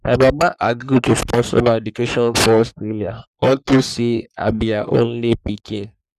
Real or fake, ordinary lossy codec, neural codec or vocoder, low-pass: fake; none; codec, 44.1 kHz, 3.4 kbps, Pupu-Codec; 14.4 kHz